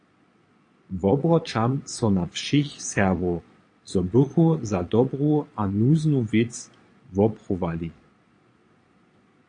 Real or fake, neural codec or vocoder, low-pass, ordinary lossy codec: fake; vocoder, 22.05 kHz, 80 mel bands, Vocos; 9.9 kHz; AAC, 48 kbps